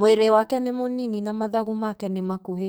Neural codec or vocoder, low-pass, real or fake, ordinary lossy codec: codec, 44.1 kHz, 2.6 kbps, SNAC; none; fake; none